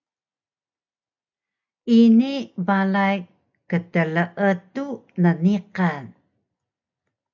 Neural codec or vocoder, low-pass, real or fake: none; 7.2 kHz; real